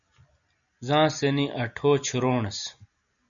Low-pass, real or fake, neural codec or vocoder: 7.2 kHz; real; none